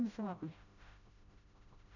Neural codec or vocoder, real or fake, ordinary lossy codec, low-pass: codec, 16 kHz, 0.5 kbps, FreqCodec, smaller model; fake; none; 7.2 kHz